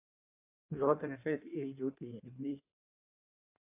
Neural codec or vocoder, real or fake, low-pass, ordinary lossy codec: codec, 16 kHz in and 24 kHz out, 1.1 kbps, FireRedTTS-2 codec; fake; 3.6 kHz; AAC, 32 kbps